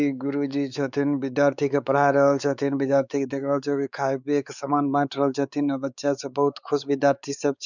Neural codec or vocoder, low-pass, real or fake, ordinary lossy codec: codec, 16 kHz, 4 kbps, X-Codec, WavLM features, trained on Multilingual LibriSpeech; 7.2 kHz; fake; none